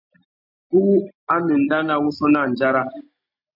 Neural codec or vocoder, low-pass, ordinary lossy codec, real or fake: none; 5.4 kHz; MP3, 48 kbps; real